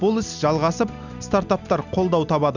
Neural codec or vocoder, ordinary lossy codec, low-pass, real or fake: none; none; 7.2 kHz; real